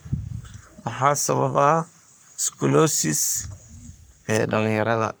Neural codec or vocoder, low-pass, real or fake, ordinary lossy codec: codec, 44.1 kHz, 3.4 kbps, Pupu-Codec; none; fake; none